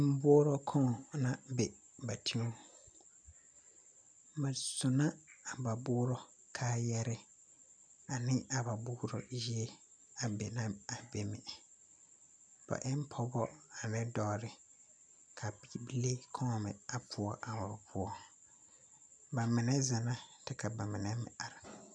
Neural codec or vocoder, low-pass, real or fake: none; 9.9 kHz; real